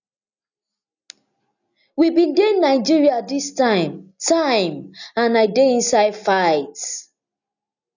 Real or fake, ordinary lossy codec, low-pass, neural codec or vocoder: real; none; 7.2 kHz; none